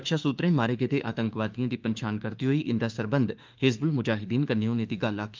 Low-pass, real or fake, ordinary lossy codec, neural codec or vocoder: 7.2 kHz; fake; Opus, 24 kbps; autoencoder, 48 kHz, 32 numbers a frame, DAC-VAE, trained on Japanese speech